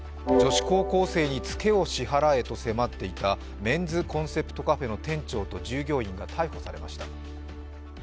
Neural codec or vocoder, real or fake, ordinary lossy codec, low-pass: none; real; none; none